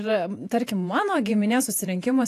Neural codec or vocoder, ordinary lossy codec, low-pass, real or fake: vocoder, 48 kHz, 128 mel bands, Vocos; AAC, 64 kbps; 14.4 kHz; fake